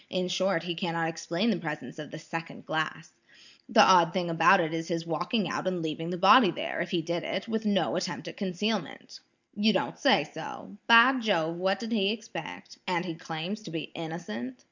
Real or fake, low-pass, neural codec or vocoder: real; 7.2 kHz; none